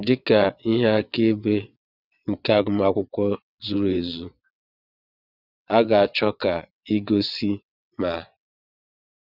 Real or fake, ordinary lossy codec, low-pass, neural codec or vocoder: fake; none; 5.4 kHz; vocoder, 22.05 kHz, 80 mel bands, WaveNeXt